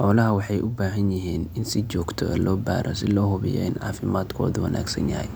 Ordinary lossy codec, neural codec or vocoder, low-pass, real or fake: none; none; none; real